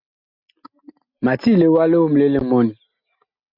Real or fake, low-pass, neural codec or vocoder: real; 5.4 kHz; none